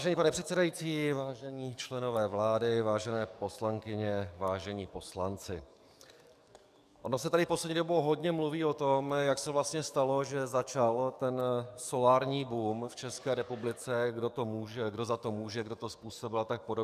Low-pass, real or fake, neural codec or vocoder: 14.4 kHz; fake; codec, 44.1 kHz, 7.8 kbps, DAC